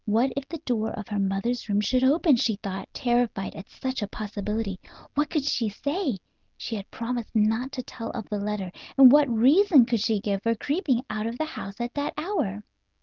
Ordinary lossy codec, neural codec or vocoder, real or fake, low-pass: Opus, 32 kbps; none; real; 7.2 kHz